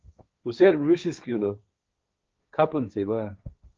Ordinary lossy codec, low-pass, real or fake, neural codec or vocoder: Opus, 24 kbps; 7.2 kHz; fake; codec, 16 kHz, 1.1 kbps, Voila-Tokenizer